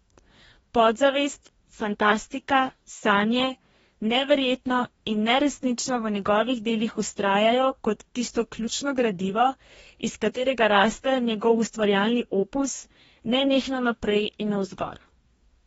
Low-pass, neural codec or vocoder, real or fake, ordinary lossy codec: 19.8 kHz; codec, 44.1 kHz, 2.6 kbps, DAC; fake; AAC, 24 kbps